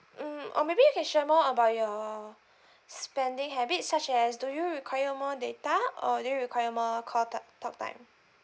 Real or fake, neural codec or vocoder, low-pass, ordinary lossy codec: real; none; none; none